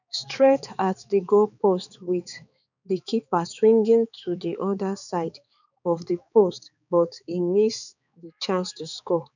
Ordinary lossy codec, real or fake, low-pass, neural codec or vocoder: none; fake; 7.2 kHz; codec, 16 kHz, 4 kbps, X-Codec, HuBERT features, trained on balanced general audio